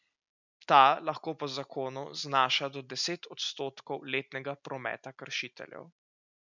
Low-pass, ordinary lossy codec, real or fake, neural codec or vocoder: 7.2 kHz; none; real; none